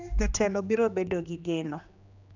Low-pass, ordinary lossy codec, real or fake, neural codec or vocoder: 7.2 kHz; none; fake; codec, 16 kHz, 2 kbps, X-Codec, HuBERT features, trained on general audio